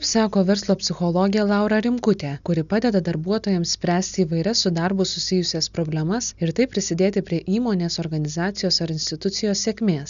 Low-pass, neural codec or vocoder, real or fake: 7.2 kHz; none; real